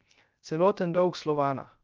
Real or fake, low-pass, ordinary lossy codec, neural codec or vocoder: fake; 7.2 kHz; Opus, 24 kbps; codec, 16 kHz, 0.3 kbps, FocalCodec